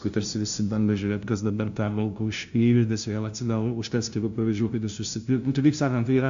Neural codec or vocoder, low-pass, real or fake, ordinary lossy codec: codec, 16 kHz, 0.5 kbps, FunCodec, trained on LibriTTS, 25 frames a second; 7.2 kHz; fake; AAC, 64 kbps